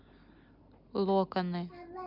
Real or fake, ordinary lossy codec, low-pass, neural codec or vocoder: real; none; 5.4 kHz; none